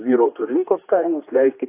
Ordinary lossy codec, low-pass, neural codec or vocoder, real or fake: AAC, 24 kbps; 3.6 kHz; codec, 16 kHz, 2 kbps, X-Codec, HuBERT features, trained on general audio; fake